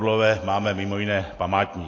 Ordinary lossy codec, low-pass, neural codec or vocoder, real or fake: AAC, 32 kbps; 7.2 kHz; none; real